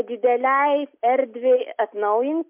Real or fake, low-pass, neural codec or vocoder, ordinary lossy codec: real; 3.6 kHz; none; MP3, 32 kbps